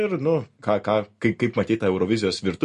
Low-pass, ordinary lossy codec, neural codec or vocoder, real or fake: 14.4 kHz; MP3, 48 kbps; none; real